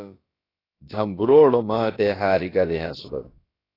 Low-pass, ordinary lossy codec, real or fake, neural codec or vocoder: 5.4 kHz; AAC, 24 kbps; fake; codec, 16 kHz, about 1 kbps, DyCAST, with the encoder's durations